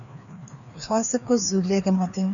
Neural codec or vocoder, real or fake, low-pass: codec, 16 kHz, 2 kbps, FreqCodec, larger model; fake; 7.2 kHz